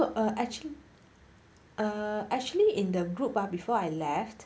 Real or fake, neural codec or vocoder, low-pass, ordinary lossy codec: real; none; none; none